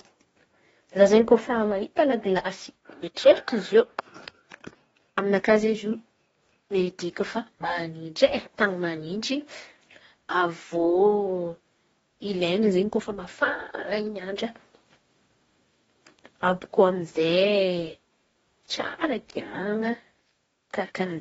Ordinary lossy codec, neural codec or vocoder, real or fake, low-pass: AAC, 24 kbps; codec, 44.1 kHz, 2.6 kbps, DAC; fake; 19.8 kHz